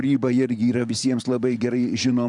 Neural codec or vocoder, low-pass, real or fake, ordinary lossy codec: vocoder, 44.1 kHz, 128 mel bands every 512 samples, BigVGAN v2; 10.8 kHz; fake; MP3, 96 kbps